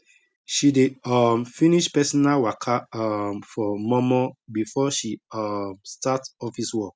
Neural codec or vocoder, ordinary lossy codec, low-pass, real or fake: none; none; none; real